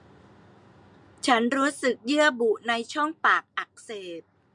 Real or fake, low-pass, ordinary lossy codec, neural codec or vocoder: real; 10.8 kHz; MP3, 64 kbps; none